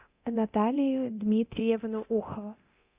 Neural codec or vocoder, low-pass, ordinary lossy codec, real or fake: codec, 24 kHz, 0.9 kbps, DualCodec; 3.6 kHz; Opus, 64 kbps; fake